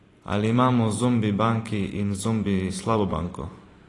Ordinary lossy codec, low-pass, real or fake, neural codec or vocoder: AAC, 32 kbps; 10.8 kHz; fake; vocoder, 44.1 kHz, 128 mel bands every 512 samples, BigVGAN v2